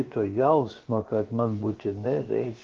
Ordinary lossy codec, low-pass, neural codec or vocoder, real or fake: Opus, 16 kbps; 7.2 kHz; codec, 16 kHz, about 1 kbps, DyCAST, with the encoder's durations; fake